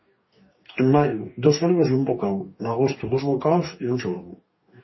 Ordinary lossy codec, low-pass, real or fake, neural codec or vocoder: MP3, 24 kbps; 7.2 kHz; fake; codec, 44.1 kHz, 2.6 kbps, DAC